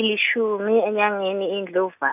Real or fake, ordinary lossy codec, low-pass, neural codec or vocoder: real; none; 3.6 kHz; none